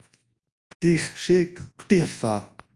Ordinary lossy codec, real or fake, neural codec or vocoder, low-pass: Opus, 32 kbps; fake; codec, 24 kHz, 0.9 kbps, WavTokenizer, large speech release; 10.8 kHz